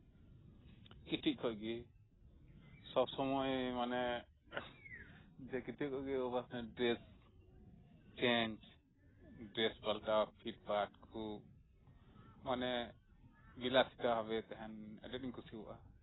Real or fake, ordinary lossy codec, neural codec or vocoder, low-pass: real; AAC, 16 kbps; none; 7.2 kHz